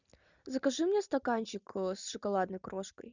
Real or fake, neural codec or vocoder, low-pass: real; none; 7.2 kHz